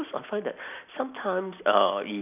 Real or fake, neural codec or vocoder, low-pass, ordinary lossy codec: real; none; 3.6 kHz; none